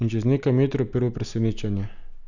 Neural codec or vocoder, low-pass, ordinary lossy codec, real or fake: none; 7.2 kHz; none; real